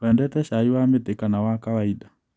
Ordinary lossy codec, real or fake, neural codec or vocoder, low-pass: none; real; none; none